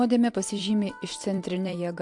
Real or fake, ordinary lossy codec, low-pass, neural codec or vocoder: fake; MP3, 64 kbps; 10.8 kHz; vocoder, 44.1 kHz, 128 mel bands every 256 samples, BigVGAN v2